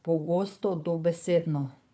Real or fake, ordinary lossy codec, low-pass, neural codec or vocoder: fake; none; none; codec, 16 kHz, 4 kbps, FunCodec, trained on Chinese and English, 50 frames a second